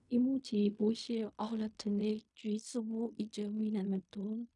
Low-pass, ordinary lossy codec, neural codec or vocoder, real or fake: 10.8 kHz; none; codec, 16 kHz in and 24 kHz out, 0.4 kbps, LongCat-Audio-Codec, fine tuned four codebook decoder; fake